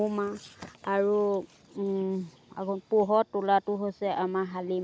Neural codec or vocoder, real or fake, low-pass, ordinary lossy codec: none; real; none; none